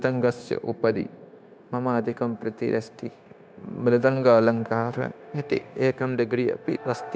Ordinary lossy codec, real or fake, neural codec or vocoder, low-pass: none; fake; codec, 16 kHz, 0.9 kbps, LongCat-Audio-Codec; none